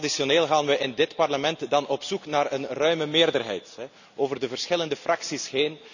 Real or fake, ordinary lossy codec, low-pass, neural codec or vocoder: real; none; 7.2 kHz; none